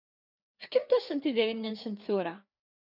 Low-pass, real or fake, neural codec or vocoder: 5.4 kHz; fake; codec, 16 kHz, 4 kbps, FreqCodec, larger model